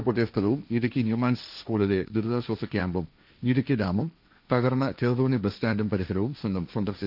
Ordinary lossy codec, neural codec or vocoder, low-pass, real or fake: none; codec, 16 kHz, 1.1 kbps, Voila-Tokenizer; 5.4 kHz; fake